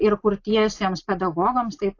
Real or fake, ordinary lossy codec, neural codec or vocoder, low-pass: real; AAC, 48 kbps; none; 7.2 kHz